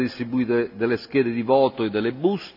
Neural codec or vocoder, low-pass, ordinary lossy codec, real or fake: none; 5.4 kHz; none; real